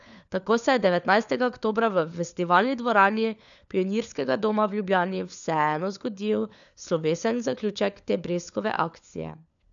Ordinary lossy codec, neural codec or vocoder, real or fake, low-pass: none; codec, 16 kHz, 4 kbps, FunCodec, trained on LibriTTS, 50 frames a second; fake; 7.2 kHz